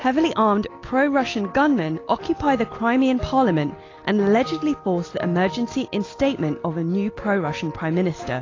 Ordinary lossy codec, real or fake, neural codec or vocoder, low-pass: AAC, 32 kbps; real; none; 7.2 kHz